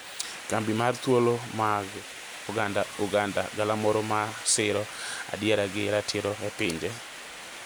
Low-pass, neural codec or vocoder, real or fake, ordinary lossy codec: none; none; real; none